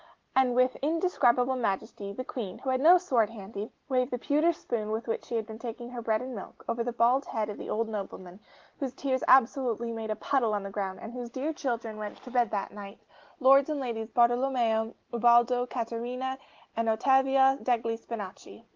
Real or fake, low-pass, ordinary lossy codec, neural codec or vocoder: real; 7.2 kHz; Opus, 16 kbps; none